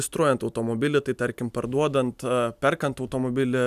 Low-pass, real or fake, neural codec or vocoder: 14.4 kHz; real; none